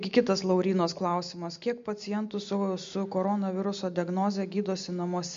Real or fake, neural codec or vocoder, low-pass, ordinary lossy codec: real; none; 7.2 kHz; MP3, 48 kbps